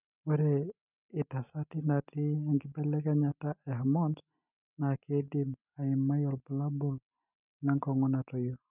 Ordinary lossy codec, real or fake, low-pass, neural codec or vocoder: none; real; 3.6 kHz; none